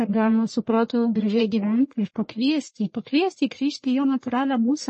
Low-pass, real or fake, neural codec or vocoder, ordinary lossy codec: 10.8 kHz; fake; codec, 44.1 kHz, 1.7 kbps, Pupu-Codec; MP3, 32 kbps